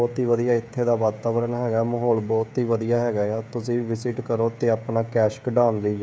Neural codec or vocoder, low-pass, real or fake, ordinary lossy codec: codec, 16 kHz, 16 kbps, FreqCodec, smaller model; none; fake; none